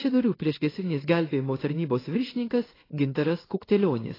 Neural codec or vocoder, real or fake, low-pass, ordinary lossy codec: codec, 16 kHz in and 24 kHz out, 1 kbps, XY-Tokenizer; fake; 5.4 kHz; AAC, 24 kbps